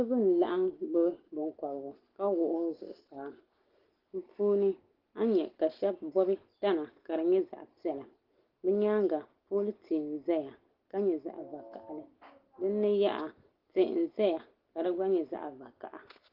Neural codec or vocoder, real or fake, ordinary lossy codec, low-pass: none; real; Opus, 16 kbps; 5.4 kHz